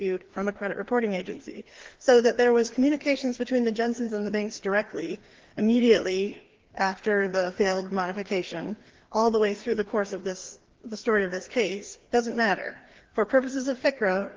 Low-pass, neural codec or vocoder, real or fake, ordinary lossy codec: 7.2 kHz; codec, 16 kHz, 2 kbps, FreqCodec, larger model; fake; Opus, 16 kbps